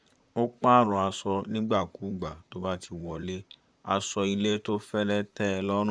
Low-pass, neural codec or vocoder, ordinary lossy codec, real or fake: 9.9 kHz; vocoder, 44.1 kHz, 128 mel bands, Pupu-Vocoder; none; fake